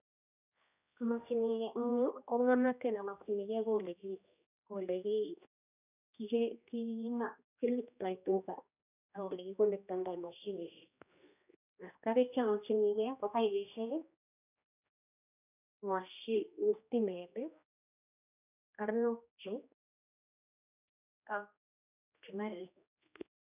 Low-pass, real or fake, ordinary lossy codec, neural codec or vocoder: 3.6 kHz; fake; none; codec, 16 kHz, 1 kbps, X-Codec, HuBERT features, trained on general audio